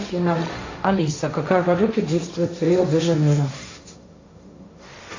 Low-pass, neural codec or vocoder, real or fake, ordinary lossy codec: 7.2 kHz; codec, 16 kHz, 1.1 kbps, Voila-Tokenizer; fake; AAC, 48 kbps